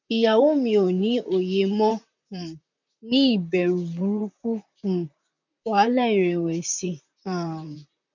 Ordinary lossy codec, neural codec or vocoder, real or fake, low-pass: none; vocoder, 44.1 kHz, 128 mel bands, Pupu-Vocoder; fake; 7.2 kHz